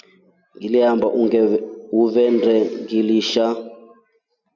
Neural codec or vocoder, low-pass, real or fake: none; 7.2 kHz; real